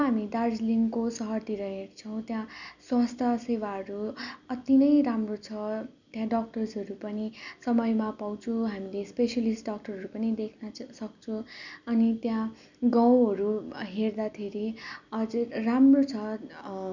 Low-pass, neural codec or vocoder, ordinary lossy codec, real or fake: 7.2 kHz; none; none; real